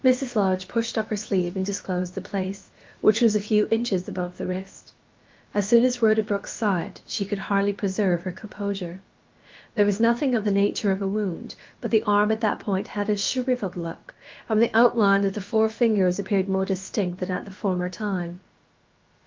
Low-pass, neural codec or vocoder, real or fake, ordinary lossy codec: 7.2 kHz; codec, 16 kHz, about 1 kbps, DyCAST, with the encoder's durations; fake; Opus, 32 kbps